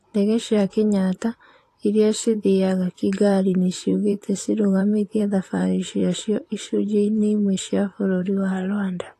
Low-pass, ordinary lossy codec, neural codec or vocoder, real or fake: 14.4 kHz; AAC, 48 kbps; vocoder, 44.1 kHz, 128 mel bands, Pupu-Vocoder; fake